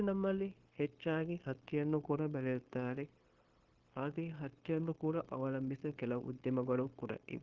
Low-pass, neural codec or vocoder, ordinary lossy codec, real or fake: 7.2 kHz; codec, 16 kHz, 0.9 kbps, LongCat-Audio-Codec; Opus, 16 kbps; fake